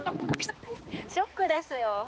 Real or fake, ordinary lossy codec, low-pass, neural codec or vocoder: fake; none; none; codec, 16 kHz, 2 kbps, X-Codec, HuBERT features, trained on balanced general audio